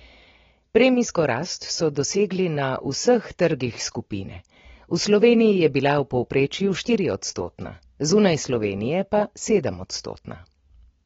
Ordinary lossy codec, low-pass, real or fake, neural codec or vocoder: AAC, 24 kbps; 7.2 kHz; real; none